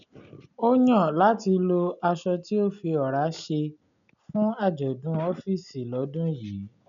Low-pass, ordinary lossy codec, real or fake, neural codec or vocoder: 7.2 kHz; none; real; none